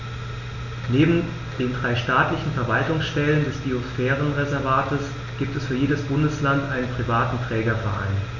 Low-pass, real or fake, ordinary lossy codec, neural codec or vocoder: 7.2 kHz; real; none; none